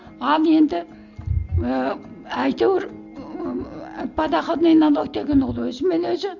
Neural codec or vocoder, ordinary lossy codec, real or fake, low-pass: none; none; real; 7.2 kHz